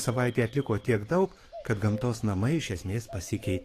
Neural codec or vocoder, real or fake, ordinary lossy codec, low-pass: codec, 44.1 kHz, 7.8 kbps, DAC; fake; AAC, 48 kbps; 14.4 kHz